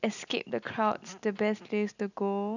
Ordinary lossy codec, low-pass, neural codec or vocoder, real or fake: none; 7.2 kHz; none; real